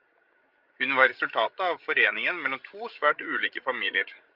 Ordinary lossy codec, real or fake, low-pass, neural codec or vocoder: Opus, 32 kbps; fake; 5.4 kHz; codec, 16 kHz, 16 kbps, FreqCodec, larger model